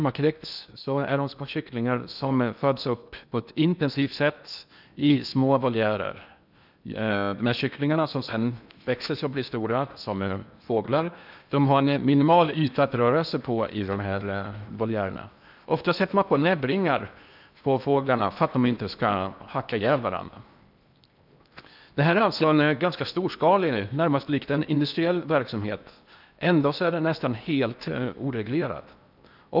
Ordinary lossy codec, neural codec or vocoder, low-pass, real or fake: none; codec, 16 kHz in and 24 kHz out, 0.8 kbps, FocalCodec, streaming, 65536 codes; 5.4 kHz; fake